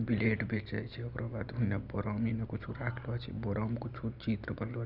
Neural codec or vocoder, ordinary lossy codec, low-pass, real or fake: none; none; 5.4 kHz; real